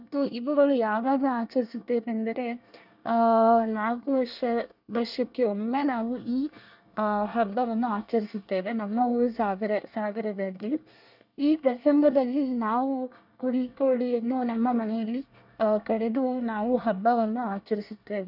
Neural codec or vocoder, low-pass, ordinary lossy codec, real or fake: codec, 24 kHz, 1 kbps, SNAC; 5.4 kHz; none; fake